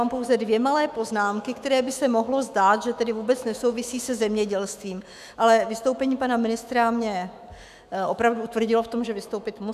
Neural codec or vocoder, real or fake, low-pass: autoencoder, 48 kHz, 128 numbers a frame, DAC-VAE, trained on Japanese speech; fake; 14.4 kHz